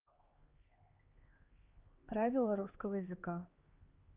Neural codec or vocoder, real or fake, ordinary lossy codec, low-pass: codec, 16 kHz, 2 kbps, X-Codec, HuBERT features, trained on LibriSpeech; fake; Opus, 32 kbps; 3.6 kHz